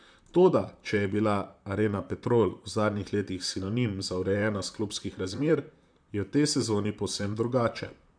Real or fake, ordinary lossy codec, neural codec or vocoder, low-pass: fake; MP3, 96 kbps; vocoder, 44.1 kHz, 128 mel bands, Pupu-Vocoder; 9.9 kHz